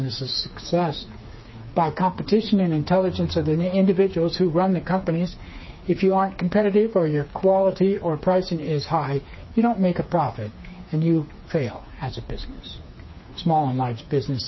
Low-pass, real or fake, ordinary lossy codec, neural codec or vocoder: 7.2 kHz; fake; MP3, 24 kbps; codec, 16 kHz, 4 kbps, FreqCodec, smaller model